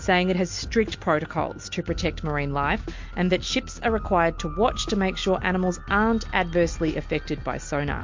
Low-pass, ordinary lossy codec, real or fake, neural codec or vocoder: 7.2 kHz; MP3, 48 kbps; real; none